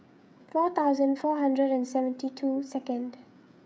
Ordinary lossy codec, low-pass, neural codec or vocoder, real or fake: none; none; codec, 16 kHz, 16 kbps, FreqCodec, smaller model; fake